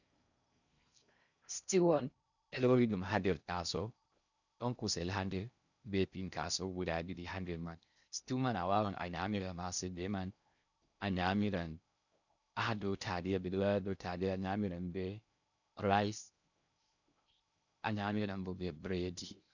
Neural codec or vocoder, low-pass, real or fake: codec, 16 kHz in and 24 kHz out, 0.6 kbps, FocalCodec, streaming, 4096 codes; 7.2 kHz; fake